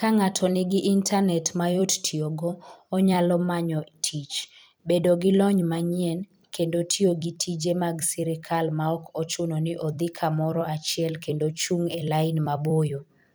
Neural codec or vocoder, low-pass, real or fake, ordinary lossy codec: vocoder, 44.1 kHz, 128 mel bands every 256 samples, BigVGAN v2; none; fake; none